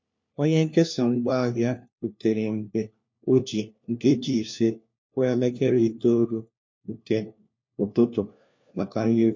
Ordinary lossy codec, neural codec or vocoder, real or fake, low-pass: MP3, 48 kbps; codec, 16 kHz, 1 kbps, FunCodec, trained on LibriTTS, 50 frames a second; fake; 7.2 kHz